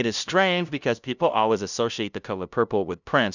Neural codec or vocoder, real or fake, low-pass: codec, 16 kHz, 0.5 kbps, FunCodec, trained on LibriTTS, 25 frames a second; fake; 7.2 kHz